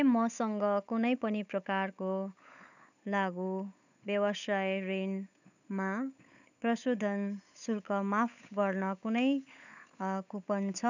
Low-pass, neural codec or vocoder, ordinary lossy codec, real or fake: 7.2 kHz; codec, 16 kHz, 8 kbps, FunCodec, trained on Chinese and English, 25 frames a second; none; fake